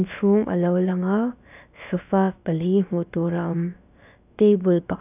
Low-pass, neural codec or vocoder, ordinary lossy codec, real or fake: 3.6 kHz; codec, 16 kHz, about 1 kbps, DyCAST, with the encoder's durations; none; fake